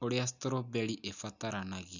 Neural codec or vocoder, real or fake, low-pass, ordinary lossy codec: none; real; 7.2 kHz; none